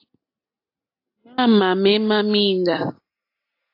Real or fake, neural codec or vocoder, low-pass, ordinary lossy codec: real; none; 5.4 kHz; AAC, 32 kbps